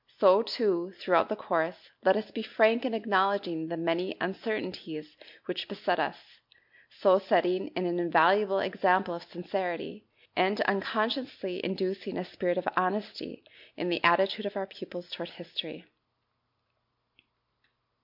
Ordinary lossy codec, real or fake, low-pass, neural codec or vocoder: MP3, 48 kbps; real; 5.4 kHz; none